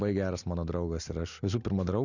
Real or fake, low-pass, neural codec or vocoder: real; 7.2 kHz; none